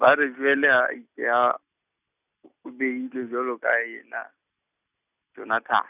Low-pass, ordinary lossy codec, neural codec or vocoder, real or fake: 3.6 kHz; none; none; real